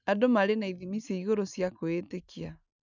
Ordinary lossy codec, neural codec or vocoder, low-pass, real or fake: none; none; 7.2 kHz; real